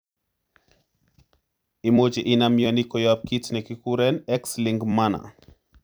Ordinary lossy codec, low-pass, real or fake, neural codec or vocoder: none; none; fake; vocoder, 44.1 kHz, 128 mel bands every 256 samples, BigVGAN v2